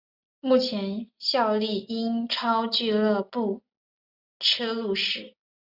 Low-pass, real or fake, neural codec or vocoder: 5.4 kHz; real; none